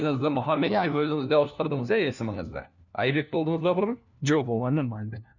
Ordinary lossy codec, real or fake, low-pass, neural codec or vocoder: none; fake; 7.2 kHz; codec, 16 kHz, 1 kbps, FunCodec, trained on LibriTTS, 50 frames a second